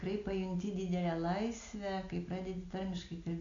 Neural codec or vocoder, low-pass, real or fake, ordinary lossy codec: none; 7.2 kHz; real; AAC, 64 kbps